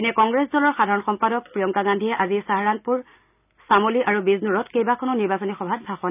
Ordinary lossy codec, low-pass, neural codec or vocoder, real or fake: none; 3.6 kHz; none; real